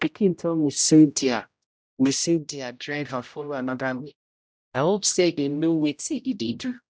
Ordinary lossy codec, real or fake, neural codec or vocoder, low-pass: none; fake; codec, 16 kHz, 0.5 kbps, X-Codec, HuBERT features, trained on general audio; none